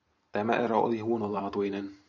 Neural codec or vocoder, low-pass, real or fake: vocoder, 44.1 kHz, 128 mel bands every 512 samples, BigVGAN v2; 7.2 kHz; fake